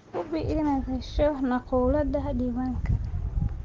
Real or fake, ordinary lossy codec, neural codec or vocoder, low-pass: real; Opus, 16 kbps; none; 7.2 kHz